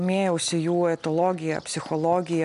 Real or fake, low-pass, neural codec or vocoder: real; 10.8 kHz; none